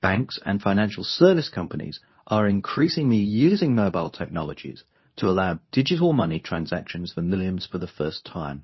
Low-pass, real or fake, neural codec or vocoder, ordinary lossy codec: 7.2 kHz; fake; codec, 24 kHz, 0.9 kbps, WavTokenizer, medium speech release version 2; MP3, 24 kbps